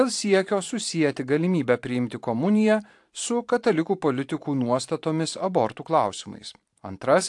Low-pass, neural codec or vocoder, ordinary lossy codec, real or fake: 10.8 kHz; vocoder, 44.1 kHz, 128 mel bands every 512 samples, BigVGAN v2; AAC, 64 kbps; fake